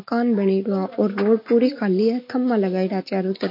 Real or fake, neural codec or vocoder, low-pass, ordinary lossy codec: real; none; 5.4 kHz; MP3, 32 kbps